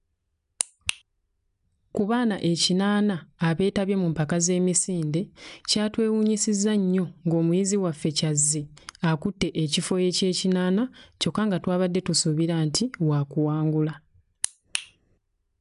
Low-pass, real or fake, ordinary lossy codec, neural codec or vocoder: 10.8 kHz; real; none; none